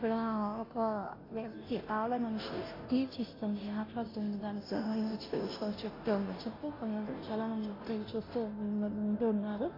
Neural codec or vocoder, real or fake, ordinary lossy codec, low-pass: codec, 16 kHz, 0.5 kbps, FunCodec, trained on Chinese and English, 25 frames a second; fake; AAC, 24 kbps; 5.4 kHz